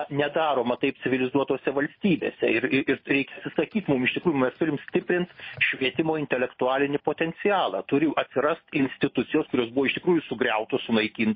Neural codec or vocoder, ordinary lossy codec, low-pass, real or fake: none; MP3, 24 kbps; 5.4 kHz; real